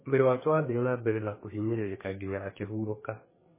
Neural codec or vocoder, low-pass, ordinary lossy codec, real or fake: codec, 32 kHz, 1.9 kbps, SNAC; 3.6 kHz; MP3, 16 kbps; fake